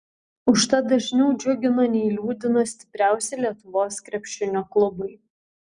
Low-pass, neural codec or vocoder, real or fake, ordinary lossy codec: 9.9 kHz; none; real; Opus, 64 kbps